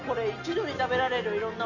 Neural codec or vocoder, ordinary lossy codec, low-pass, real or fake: none; none; 7.2 kHz; real